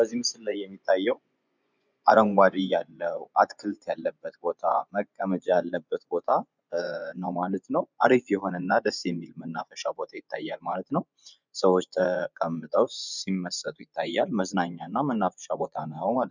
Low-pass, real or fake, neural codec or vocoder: 7.2 kHz; fake; vocoder, 24 kHz, 100 mel bands, Vocos